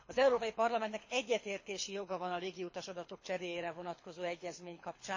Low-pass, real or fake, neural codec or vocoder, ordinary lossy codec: 7.2 kHz; fake; codec, 24 kHz, 6 kbps, HILCodec; MP3, 32 kbps